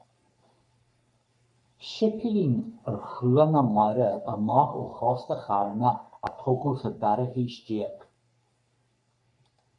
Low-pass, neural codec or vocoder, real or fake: 10.8 kHz; codec, 44.1 kHz, 3.4 kbps, Pupu-Codec; fake